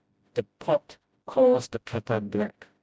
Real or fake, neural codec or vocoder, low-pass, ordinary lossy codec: fake; codec, 16 kHz, 0.5 kbps, FreqCodec, smaller model; none; none